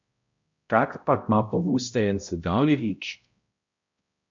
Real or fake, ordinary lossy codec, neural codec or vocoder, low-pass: fake; MP3, 48 kbps; codec, 16 kHz, 0.5 kbps, X-Codec, HuBERT features, trained on balanced general audio; 7.2 kHz